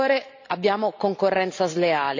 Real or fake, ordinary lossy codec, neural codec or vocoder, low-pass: fake; AAC, 48 kbps; vocoder, 44.1 kHz, 128 mel bands every 512 samples, BigVGAN v2; 7.2 kHz